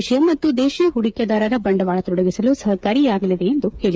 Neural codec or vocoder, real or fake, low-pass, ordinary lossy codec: codec, 16 kHz, 8 kbps, FreqCodec, smaller model; fake; none; none